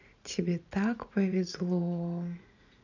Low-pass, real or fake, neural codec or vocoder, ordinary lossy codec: 7.2 kHz; fake; vocoder, 44.1 kHz, 80 mel bands, Vocos; none